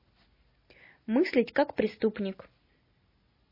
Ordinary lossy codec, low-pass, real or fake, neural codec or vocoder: MP3, 24 kbps; 5.4 kHz; real; none